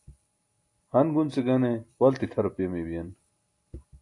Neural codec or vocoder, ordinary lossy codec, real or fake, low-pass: none; AAC, 48 kbps; real; 10.8 kHz